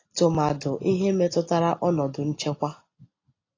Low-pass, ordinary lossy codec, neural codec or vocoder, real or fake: 7.2 kHz; AAC, 32 kbps; none; real